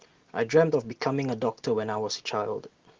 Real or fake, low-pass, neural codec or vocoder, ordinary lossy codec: real; 7.2 kHz; none; Opus, 16 kbps